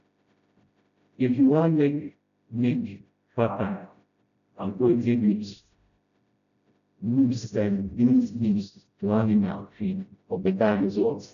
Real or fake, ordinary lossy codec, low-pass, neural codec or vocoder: fake; none; 7.2 kHz; codec, 16 kHz, 0.5 kbps, FreqCodec, smaller model